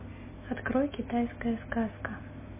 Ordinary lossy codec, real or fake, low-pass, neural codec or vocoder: MP3, 16 kbps; real; 3.6 kHz; none